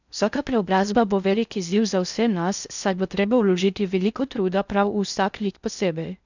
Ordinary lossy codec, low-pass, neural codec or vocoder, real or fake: none; 7.2 kHz; codec, 16 kHz in and 24 kHz out, 0.6 kbps, FocalCodec, streaming, 4096 codes; fake